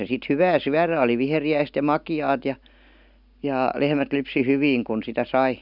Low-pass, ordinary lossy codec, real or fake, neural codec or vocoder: 5.4 kHz; none; real; none